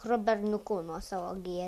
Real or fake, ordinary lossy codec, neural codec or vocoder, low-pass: real; MP3, 64 kbps; none; 14.4 kHz